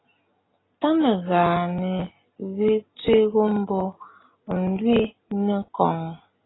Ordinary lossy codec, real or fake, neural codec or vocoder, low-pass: AAC, 16 kbps; real; none; 7.2 kHz